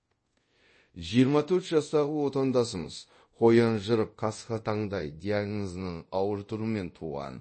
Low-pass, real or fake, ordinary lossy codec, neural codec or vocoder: 9.9 kHz; fake; MP3, 32 kbps; codec, 24 kHz, 0.5 kbps, DualCodec